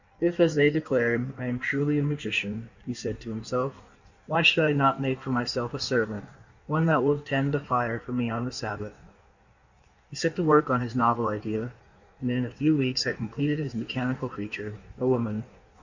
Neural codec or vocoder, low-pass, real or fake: codec, 16 kHz in and 24 kHz out, 1.1 kbps, FireRedTTS-2 codec; 7.2 kHz; fake